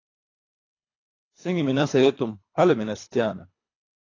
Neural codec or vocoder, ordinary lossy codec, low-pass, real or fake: codec, 24 kHz, 3 kbps, HILCodec; AAC, 32 kbps; 7.2 kHz; fake